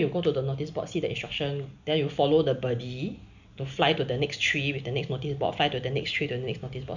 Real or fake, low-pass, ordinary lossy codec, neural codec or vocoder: real; 7.2 kHz; none; none